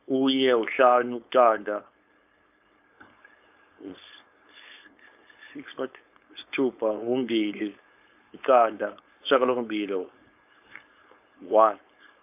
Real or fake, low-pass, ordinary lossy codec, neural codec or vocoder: fake; 3.6 kHz; AAC, 32 kbps; codec, 16 kHz, 4.8 kbps, FACodec